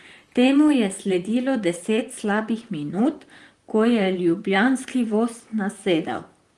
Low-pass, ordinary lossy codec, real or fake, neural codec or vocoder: 10.8 kHz; Opus, 24 kbps; fake; vocoder, 44.1 kHz, 128 mel bands, Pupu-Vocoder